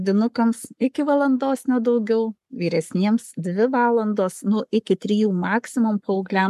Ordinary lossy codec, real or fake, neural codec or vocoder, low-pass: MP3, 96 kbps; fake; codec, 44.1 kHz, 7.8 kbps, Pupu-Codec; 14.4 kHz